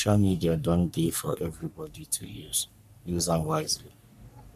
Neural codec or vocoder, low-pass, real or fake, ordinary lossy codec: codec, 44.1 kHz, 3.4 kbps, Pupu-Codec; 14.4 kHz; fake; none